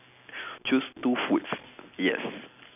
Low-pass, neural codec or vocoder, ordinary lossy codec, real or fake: 3.6 kHz; none; none; real